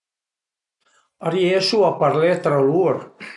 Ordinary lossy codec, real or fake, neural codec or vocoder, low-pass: none; real; none; 10.8 kHz